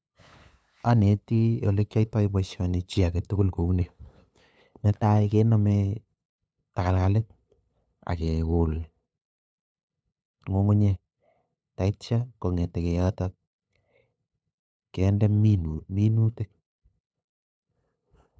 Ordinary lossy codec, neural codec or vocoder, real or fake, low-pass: none; codec, 16 kHz, 8 kbps, FunCodec, trained on LibriTTS, 25 frames a second; fake; none